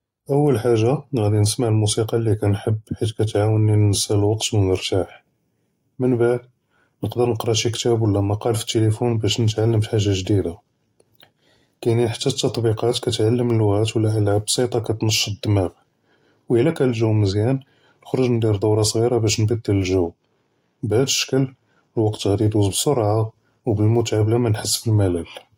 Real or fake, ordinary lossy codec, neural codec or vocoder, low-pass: real; AAC, 48 kbps; none; 19.8 kHz